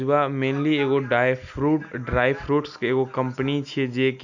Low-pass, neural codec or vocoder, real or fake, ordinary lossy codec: 7.2 kHz; none; real; none